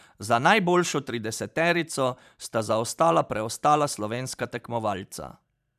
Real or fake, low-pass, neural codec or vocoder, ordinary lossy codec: fake; 14.4 kHz; vocoder, 44.1 kHz, 128 mel bands every 512 samples, BigVGAN v2; none